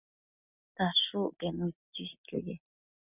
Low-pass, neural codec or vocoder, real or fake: 3.6 kHz; none; real